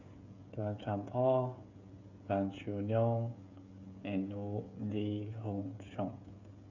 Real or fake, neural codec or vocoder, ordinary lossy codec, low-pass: fake; codec, 16 kHz, 16 kbps, FreqCodec, smaller model; none; 7.2 kHz